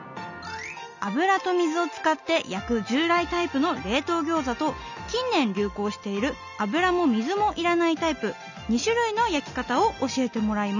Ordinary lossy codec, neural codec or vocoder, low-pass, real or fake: none; none; 7.2 kHz; real